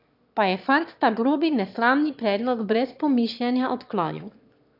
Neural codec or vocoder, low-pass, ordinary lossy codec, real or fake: autoencoder, 22.05 kHz, a latent of 192 numbers a frame, VITS, trained on one speaker; 5.4 kHz; none; fake